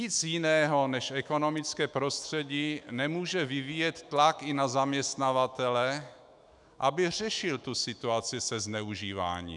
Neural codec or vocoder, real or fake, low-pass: autoencoder, 48 kHz, 128 numbers a frame, DAC-VAE, trained on Japanese speech; fake; 10.8 kHz